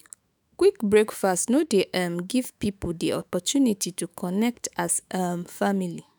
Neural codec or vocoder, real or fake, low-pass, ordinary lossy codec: autoencoder, 48 kHz, 128 numbers a frame, DAC-VAE, trained on Japanese speech; fake; none; none